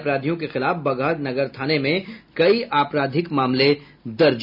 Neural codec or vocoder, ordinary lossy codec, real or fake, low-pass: none; none; real; 5.4 kHz